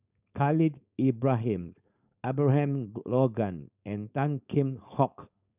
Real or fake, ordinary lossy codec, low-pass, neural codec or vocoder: fake; none; 3.6 kHz; codec, 16 kHz, 4.8 kbps, FACodec